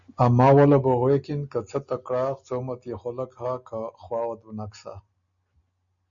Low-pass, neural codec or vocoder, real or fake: 7.2 kHz; none; real